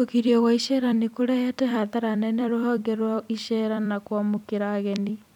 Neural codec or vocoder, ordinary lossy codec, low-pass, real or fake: vocoder, 44.1 kHz, 128 mel bands every 256 samples, BigVGAN v2; none; 19.8 kHz; fake